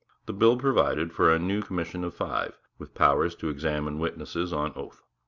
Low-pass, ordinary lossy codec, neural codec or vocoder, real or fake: 7.2 kHz; Opus, 64 kbps; none; real